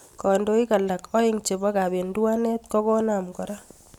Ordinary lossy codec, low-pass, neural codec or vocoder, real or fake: none; 19.8 kHz; none; real